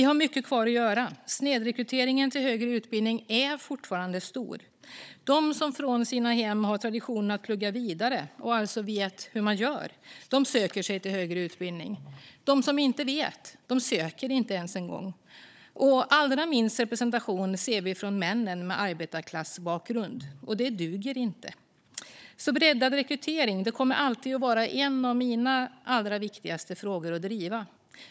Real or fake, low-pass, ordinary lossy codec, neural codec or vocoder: fake; none; none; codec, 16 kHz, 16 kbps, FunCodec, trained on Chinese and English, 50 frames a second